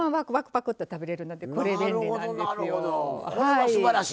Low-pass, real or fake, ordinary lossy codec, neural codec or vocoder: none; real; none; none